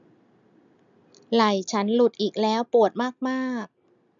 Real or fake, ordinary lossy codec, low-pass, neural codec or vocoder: real; none; 7.2 kHz; none